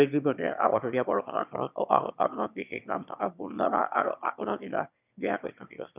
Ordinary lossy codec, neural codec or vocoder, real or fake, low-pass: none; autoencoder, 22.05 kHz, a latent of 192 numbers a frame, VITS, trained on one speaker; fake; 3.6 kHz